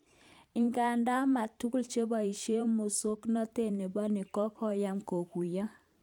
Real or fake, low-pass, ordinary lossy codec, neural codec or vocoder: fake; 19.8 kHz; none; vocoder, 44.1 kHz, 128 mel bands every 512 samples, BigVGAN v2